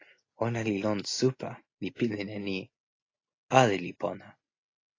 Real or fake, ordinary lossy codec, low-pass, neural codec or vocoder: real; AAC, 32 kbps; 7.2 kHz; none